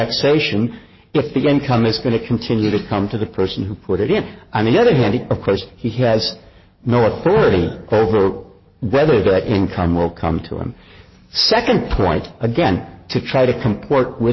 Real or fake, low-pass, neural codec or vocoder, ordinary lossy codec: fake; 7.2 kHz; codec, 44.1 kHz, 7.8 kbps, DAC; MP3, 24 kbps